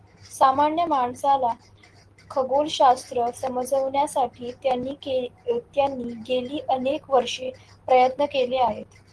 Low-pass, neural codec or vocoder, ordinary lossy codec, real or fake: 10.8 kHz; none; Opus, 16 kbps; real